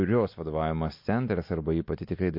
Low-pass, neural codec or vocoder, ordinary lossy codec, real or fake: 5.4 kHz; none; MP3, 32 kbps; real